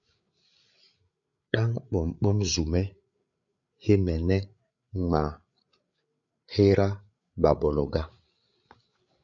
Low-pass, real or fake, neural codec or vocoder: 7.2 kHz; fake; codec, 16 kHz, 8 kbps, FreqCodec, larger model